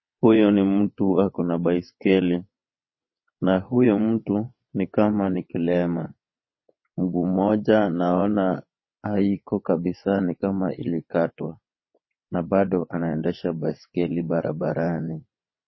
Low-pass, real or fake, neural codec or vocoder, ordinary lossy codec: 7.2 kHz; fake; vocoder, 22.05 kHz, 80 mel bands, WaveNeXt; MP3, 24 kbps